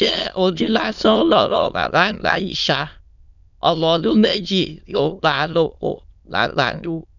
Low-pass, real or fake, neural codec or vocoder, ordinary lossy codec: 7.2 kHz; fake; autoencoder, 22.05 kHz, a latent of 192 numbers a frame, VITS, trained on many speakers; none